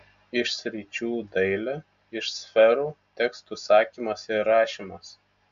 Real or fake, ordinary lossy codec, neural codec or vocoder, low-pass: real; AAC, 64 kbps; none; 7.2 kHz